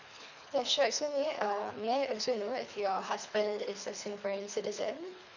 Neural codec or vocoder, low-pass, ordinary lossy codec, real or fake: codec, 24 kHz, 3 kbps, HILCodec; 7.2 kHz; none; fake